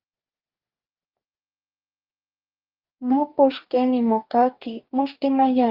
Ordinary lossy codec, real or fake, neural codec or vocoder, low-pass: Opus, 24 kbps; fake; codec, 44.1 kHz, 2.6 kbps, DAC; 5.4 kHz